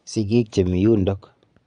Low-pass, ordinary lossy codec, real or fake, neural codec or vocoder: 9.9 kHz; none; fake; vocoder, 22.05 kHz, 80 mel bands, Vocos